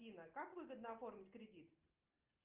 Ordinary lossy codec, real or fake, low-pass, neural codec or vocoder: Opus, 24 kbps; real; 3.6 kHz; none